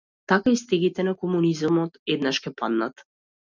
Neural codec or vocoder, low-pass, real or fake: none; 7.2 kHz; real